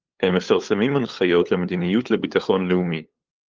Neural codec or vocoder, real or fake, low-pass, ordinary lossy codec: codec, 16 kHz, 8 kbps, FunCodec, trained on LibriTTS, 25 frames a second; fake; 7.2 kHz; Opus, 32 kbps